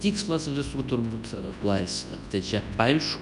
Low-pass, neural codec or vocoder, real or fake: 10.8 kHz; codec, 24 kHz, 0.9 kbps, WavTokenizer, large speech release; fake